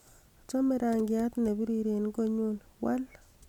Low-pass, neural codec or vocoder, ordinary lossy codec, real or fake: 19.8 kHz; none; none; real